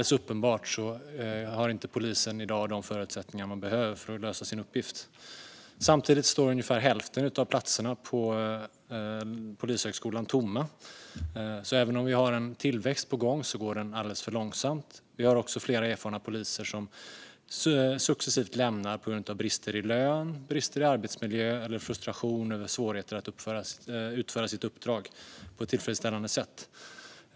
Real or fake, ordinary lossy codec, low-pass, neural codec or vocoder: real; none; none; none